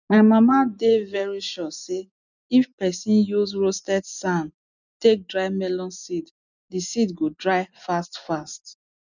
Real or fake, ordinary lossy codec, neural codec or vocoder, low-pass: real; none; none; 7.2 kHz